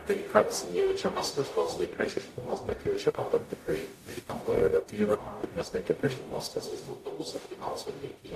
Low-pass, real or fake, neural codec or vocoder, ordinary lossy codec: 14.4 kHz; fake; codec, 44.1 kHz, 0.9 kbps, DAC; AAC, 64 kbps